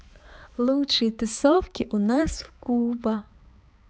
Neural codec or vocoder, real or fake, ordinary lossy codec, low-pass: codec, 16 kHz, 4 kbps, X-Codec, HuBERT features, trained on balanced general audio; fake; none; none